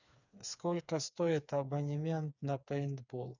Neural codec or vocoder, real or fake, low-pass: codec, 16 kHz, 4 kbps, FreqCodec, smaller model; fake; 7.2 kHz